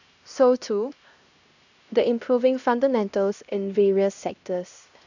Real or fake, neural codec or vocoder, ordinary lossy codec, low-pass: fake; codec, 16 kHz, 1 kbps, X-Codec, HuBERT features, trained on LibriSpeech; none; 7.2 kHz